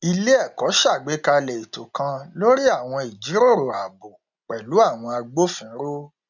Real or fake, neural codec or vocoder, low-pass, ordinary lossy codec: real; none; 7.2 kHz; none